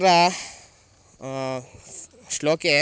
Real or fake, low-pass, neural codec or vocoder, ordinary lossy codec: real; none; none; none